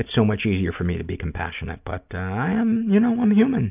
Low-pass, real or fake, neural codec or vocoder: 3.6 kHz; real; none